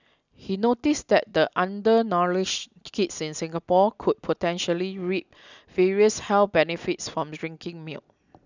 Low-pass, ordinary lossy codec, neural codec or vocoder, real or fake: 7.2 kHz; none; none; real